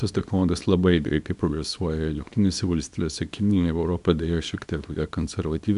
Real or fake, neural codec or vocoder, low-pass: fake; codec, 24 kHz, 0.9 kbps, WavTokenizer, small release; 10.8 kHz